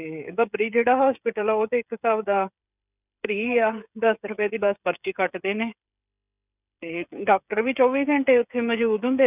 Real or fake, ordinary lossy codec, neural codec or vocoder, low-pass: fake; none; codec, 16 kHz, 16 kbps, FreqCodec, smaller model; 3.6 kHz